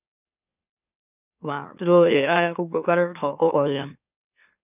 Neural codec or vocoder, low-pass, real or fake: autoencoder, 44.1 kHz, a latent of 192 numbers a frame, MeloTTS; 3.6 kHz; fake